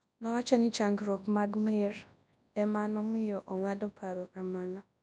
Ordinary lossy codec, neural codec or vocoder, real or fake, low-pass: none; codec, 24 kHz, 0.9 kbps, WavTokenizer, large speech release; fake; 10.8 kHz